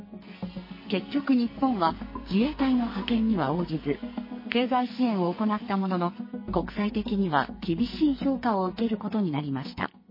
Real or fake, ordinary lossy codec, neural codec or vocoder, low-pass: fake; MP3, 24 kbps; codec, 44.1 kHz, 2.6 kbps, SNAC; 5.4 kHz